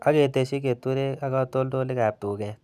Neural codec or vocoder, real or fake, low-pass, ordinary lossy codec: none; real; 14.4 kHz; none